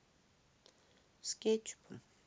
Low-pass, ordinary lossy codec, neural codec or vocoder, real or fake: none; none; none; real